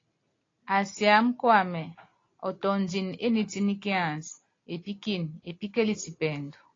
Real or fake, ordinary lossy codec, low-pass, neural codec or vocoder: real; AAC, 32 kbps; 7.2 kHz; none